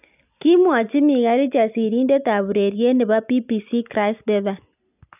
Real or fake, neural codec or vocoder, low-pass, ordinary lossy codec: real; none; 3.6 kHz; none